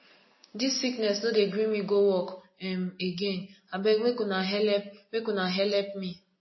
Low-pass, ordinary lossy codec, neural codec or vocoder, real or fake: 7.2 kHz; MP3, 24 kbps; none; real